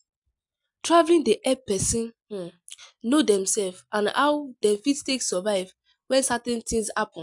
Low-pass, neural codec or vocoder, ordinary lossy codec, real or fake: 10.8 kHz; none; none; real